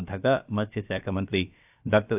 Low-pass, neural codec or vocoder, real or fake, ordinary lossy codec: 3.6 kHz; codec, 16 kHz, about 1 kbps, DyCAST, with the encoder's durations; fake; AAC, 32 kbps